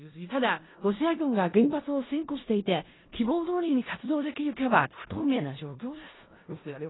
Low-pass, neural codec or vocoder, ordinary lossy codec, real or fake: 7.2 kHz; codec, 16 kHz in and 24 kHz out, 0.4 kbps, LongCat-Audio-Codec, four codebook decoder; AAC, 16 kbps; fake